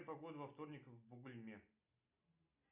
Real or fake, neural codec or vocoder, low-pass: real; none; 3.6 kHz